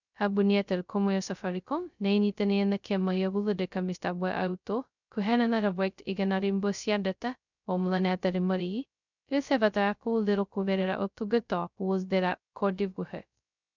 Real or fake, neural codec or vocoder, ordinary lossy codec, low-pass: fake; codec, 16 kHz, 0.2 kbps, FocalCodec; none; 7.2 kHz